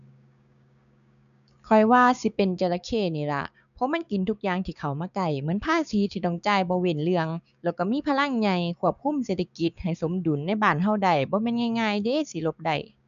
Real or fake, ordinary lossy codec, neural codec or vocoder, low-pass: fake; none; codec, 16 kHz, 6 kbps, DAC; 7.2 kHz